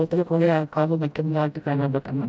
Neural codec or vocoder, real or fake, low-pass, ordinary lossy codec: codec, 16 kHz, 0.5 kbps, FreqCodec, smaller model; fake; none; none